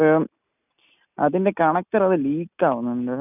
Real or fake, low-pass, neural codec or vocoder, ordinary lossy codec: real; 3.6 kHz; none; none